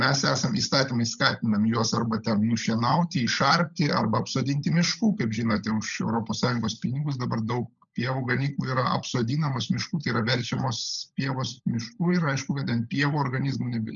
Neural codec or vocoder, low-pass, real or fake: codec, 16 kHz, 16 kbps, FunCodec, trained on LibriTTS, 50 frames a second; 7.2 kHz; fake